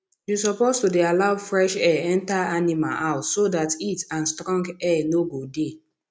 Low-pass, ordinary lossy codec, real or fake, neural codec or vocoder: none; none; real; none